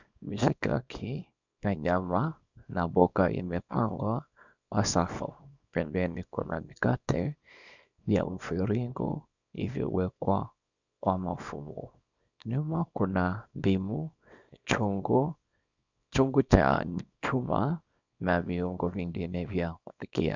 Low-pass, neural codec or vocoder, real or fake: 7.2 kHz; codec, 24 kHz, 0.9 kbps, WavTokenizer, small release; fake